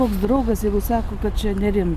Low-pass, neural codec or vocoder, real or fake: 14.4 kHz; none; real